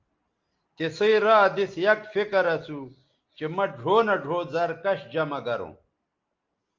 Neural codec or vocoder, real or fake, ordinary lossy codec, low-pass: none; real; Opus, 32 kbps; 7.2 kHz